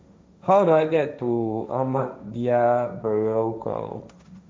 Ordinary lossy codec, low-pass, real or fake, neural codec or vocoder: none; none; fake; codec, 16 kHz, 1.1 kbps, Voila-Tokenizer